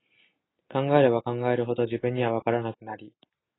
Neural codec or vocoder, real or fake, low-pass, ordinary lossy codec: none; real; 7.2 kHz; AAC, 16 kbps